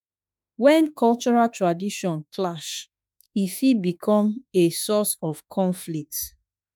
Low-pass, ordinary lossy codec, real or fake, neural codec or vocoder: none; none; fake; autoencoder, 48 kHz, 32 numbers a frame, DAC-VAE, trained on Japanese speech